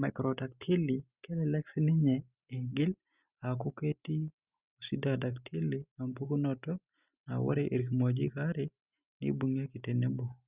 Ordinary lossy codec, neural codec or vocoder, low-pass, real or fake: Opus, 64 kbps; none; 3.6 kHz; real